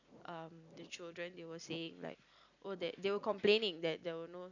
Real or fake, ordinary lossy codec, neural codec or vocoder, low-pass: real; none; none; 7.2 kHz